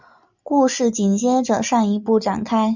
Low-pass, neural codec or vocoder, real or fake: 7.2 kHz; none; real